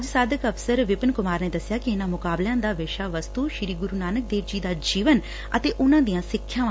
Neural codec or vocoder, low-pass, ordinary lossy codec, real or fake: none; none; none; real